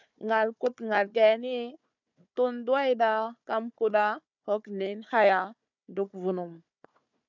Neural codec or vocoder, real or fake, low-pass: codec, 16 kHz, 4 kbps, FunCodec, trained on Chinese and English, 50 frames a second; fake; 7.2 kHz